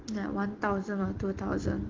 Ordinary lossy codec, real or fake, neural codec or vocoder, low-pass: Opus, 16 kbps; real; none; 7.2 kHz